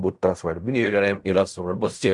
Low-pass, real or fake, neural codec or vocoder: 10.8 kHz; fake; codec, 16 kHz in and 24 kHz out, 0.4 kbps, LongCat-Audio-Codec, fine tuned four codebook decoder